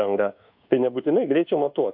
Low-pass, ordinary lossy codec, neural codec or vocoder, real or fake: 5.4 kHz; AAC, 48 kbps; codec, 24 kHz, 1.2 kbps, DualCodec; fake